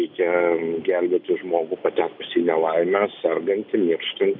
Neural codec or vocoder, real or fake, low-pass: none; real; 5.4 kHz